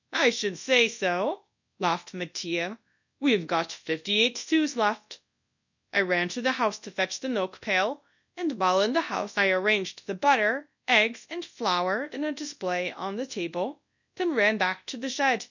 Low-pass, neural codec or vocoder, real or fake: 7.2 kHz; codec, 24 kHz, 0.9 kbps, WavTokenizer, large speech release; fake